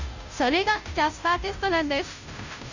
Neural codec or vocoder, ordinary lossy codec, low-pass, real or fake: codec, 16 kHz, 0.5 kbps, FunCodec, trained on Chinese and English, 25 frames a second; none; 7.2 kHz; fake